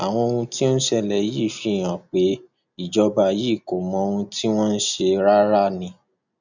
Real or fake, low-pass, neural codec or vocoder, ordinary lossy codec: real; 7.2 kHz; none; none